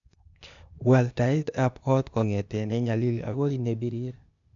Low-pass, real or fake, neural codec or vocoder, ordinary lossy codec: 7.2 kHz; fake; codec, 16 kHz, 0.8 kbps, ZipCodec; AAC, 64 kbps